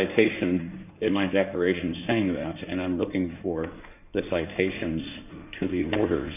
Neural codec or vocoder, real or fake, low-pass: codec, 16 kHz in and 24 kHz out, 2.2 kbps, FireRedTTS-2 codec; fake; 3.6 kHz